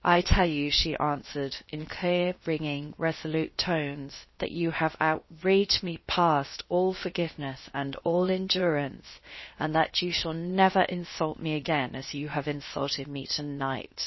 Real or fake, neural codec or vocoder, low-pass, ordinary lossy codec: fake; codec, 16 kHz, 0.7 kbps, FocalCodec; 7.2 kHz; MP3, 24 kbps